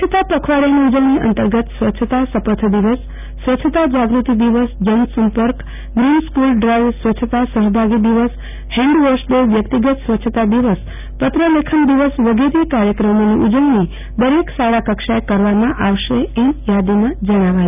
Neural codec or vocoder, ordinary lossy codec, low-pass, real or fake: none; none; 3.6 kHz; real